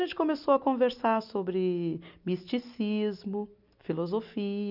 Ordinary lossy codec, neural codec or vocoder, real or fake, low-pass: none; none; real; 5.4 kHz